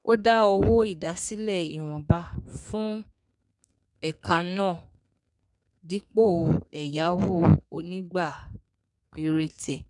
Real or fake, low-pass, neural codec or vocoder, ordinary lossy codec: fake; 10.8 kHz; codec, 32 kHz, 1.9 kbps, SNAC; none